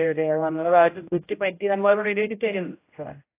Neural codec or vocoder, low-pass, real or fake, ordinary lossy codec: codec, 16 kHz, 0.5 kbps, X-Codec, HuBERT features, trained on general audio; 3.6 kHz; fake; AAC, 24 kbps